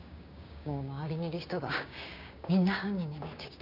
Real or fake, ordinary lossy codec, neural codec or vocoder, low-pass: fake; none; codec, 16 kHz, 6 kbps, DAC; 5.4 kHz